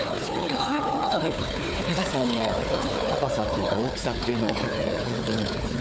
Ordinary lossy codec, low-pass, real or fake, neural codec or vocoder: none; none; fake; codec, 16 kHz, 4 kbps, FunCodec, trained on Chinese and English, 50 frames a second